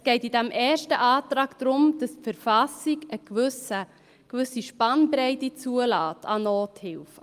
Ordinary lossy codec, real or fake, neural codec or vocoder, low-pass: Opus, 32 kbps; real; none; 14.4 kHz